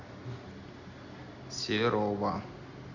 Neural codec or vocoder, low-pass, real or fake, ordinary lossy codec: none; 7.2 kHz; real; none